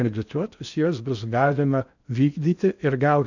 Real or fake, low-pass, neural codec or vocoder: fake; 7.2 kHz; codec, 16 kHz in and 24 kHz out, 0.6 kbps, FocalCodec, streaming, 4096 codes